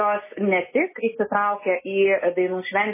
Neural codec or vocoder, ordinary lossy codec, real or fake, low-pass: none; MP3, 16 kbps; real; 3.6 kHz